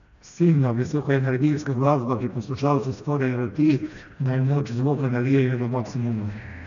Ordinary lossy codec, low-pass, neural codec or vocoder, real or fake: none; 7.2 kHz; codec, 16 kHz, 1 kbps, FreqCodec, smaller model; fake